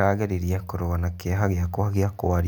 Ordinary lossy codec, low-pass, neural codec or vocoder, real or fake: none; none; none; real